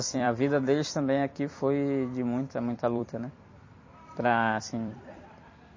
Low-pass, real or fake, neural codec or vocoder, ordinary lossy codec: 7.2 kHz; fake; vocoder, 44.1 kHz, 128 mel bands every 256 samples, BigVGAN v2; MP3, 32 kbps